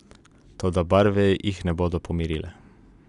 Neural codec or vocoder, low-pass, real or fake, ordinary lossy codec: none; 10.8 kHz; real; none